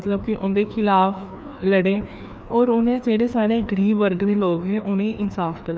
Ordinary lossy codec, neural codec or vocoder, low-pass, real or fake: none; codec, 16 kHz, 2 kbps, FreqCodec, larger model; none; fake